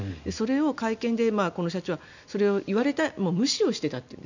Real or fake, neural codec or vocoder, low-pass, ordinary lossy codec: real; none; 7.2 kHz; none